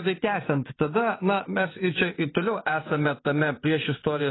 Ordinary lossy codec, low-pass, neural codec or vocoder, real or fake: AAC, 16 kbps; 7.2 kHz; vocoder, 24 kHz, 100 mel bands, Vocos; fake